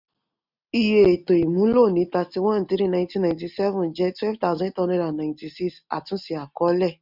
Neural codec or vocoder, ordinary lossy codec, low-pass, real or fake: none; none; 5.4 kHz; real